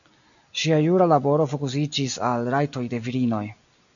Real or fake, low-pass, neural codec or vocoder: real; 7.2 kHz; none